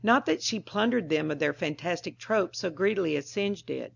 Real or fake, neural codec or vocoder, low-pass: real; none; 7.2 kHz